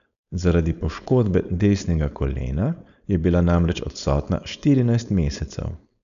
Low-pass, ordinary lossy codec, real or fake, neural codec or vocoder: 7.2 kHz; none; fake; codec, 16 kHz, 4.8 kbps, FACodec